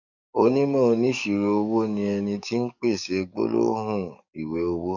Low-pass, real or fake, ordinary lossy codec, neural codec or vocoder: 7.2 kHz; fake; none; codec, 44.1 kHz, 7.8 kbps, DAC